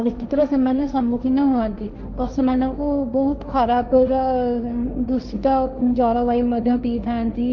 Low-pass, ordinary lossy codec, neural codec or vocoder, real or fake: 7.2 kHz; none; codec, 16 kHz, 1.1 kbps, Voila-Tokenizer; fake